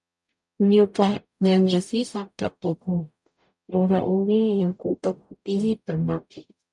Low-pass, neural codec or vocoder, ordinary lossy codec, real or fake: 10.8 kHz; codec, 44.1 kHz, 0.9 kbps, DAC; MP3, 96 kbps; fake